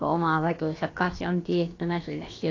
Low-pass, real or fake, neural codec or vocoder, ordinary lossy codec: 7.2 kHz; fake; codec, 16 kHz, about 1 kbps, DyCAST, with the encoder's durations; AAC, 32 kbps